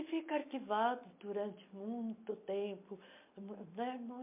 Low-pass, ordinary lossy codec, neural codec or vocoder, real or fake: 3.6 kHz; MP3, 24 kbps; none; real